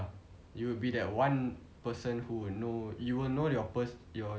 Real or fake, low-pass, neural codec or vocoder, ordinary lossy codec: real; none; none; none